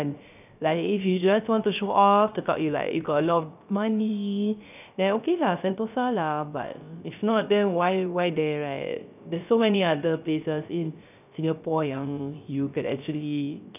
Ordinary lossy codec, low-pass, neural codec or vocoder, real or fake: none; 3.6 kHz; codec, 16 kHz, 0.3 kbps, FocalCodec; fake